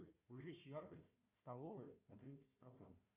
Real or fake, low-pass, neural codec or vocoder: fake; 3.6 kHz; codec, 16 kHz, 1 kbps, FunCodec, trained on Chinese and English, 50 frames a second